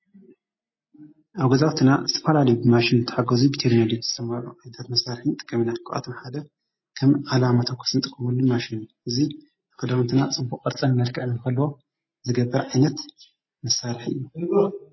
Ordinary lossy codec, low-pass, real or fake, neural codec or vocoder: MP3, 24 kbps; 7.2 kHz; real; none